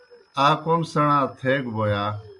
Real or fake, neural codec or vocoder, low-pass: real; none; 10.8 kHz